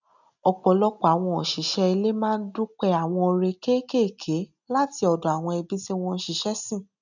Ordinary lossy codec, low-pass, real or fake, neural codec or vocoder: none; 7.2 kHz; real; none